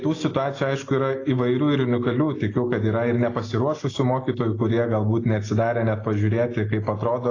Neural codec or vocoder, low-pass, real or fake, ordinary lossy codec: none; 7.2 kHz; real; AAC, 32 kbps